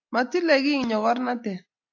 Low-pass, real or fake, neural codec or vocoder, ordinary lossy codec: 7.2 kHz; real; none; AAC, 48 kbps